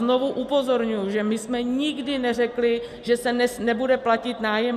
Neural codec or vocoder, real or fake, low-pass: none; real; 14.4 kHz